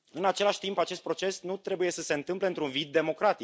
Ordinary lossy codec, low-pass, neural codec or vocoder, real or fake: none; none; none; real